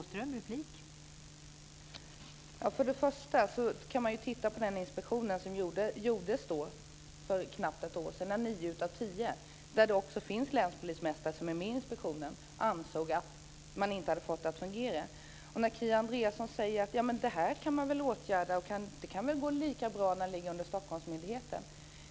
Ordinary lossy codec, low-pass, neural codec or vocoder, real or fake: none; none; none; real